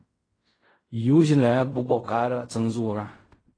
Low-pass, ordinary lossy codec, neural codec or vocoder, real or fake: 9.9 kHz; AAC, 32 kbps; codec, 16 kHz in and 24 kHz out, 0.4 kbps, LongCat-Audio-Codec, fine tuned four codebook decoder; fake